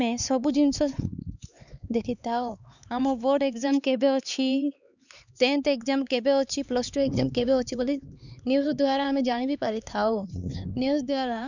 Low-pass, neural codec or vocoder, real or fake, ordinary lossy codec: 7.2 kHz; codec, 16 kHz, 4 kbps, X-Codec, HuBERT features, trained on LibriSpeech; fake; none